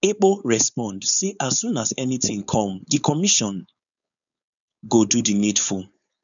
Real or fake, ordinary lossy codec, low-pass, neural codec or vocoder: fake; none; 7.2 kHz; codec, 16 kHz, 4.8 kbps, FACodec